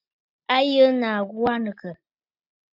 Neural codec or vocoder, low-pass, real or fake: none; 5.4 kHz; real